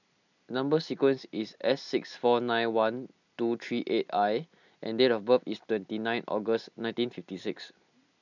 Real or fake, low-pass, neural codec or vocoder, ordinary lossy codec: real; 7.2 kHz; none; none